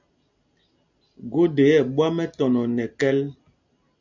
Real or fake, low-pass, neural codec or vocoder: real; 7.2 kHz; none